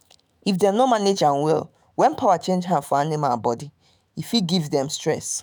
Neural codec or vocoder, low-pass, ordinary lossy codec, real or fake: autoencoder, 48 kHz, 128 numbers a frame, DAC-VAE, trained on Japanese speech; none; none; fake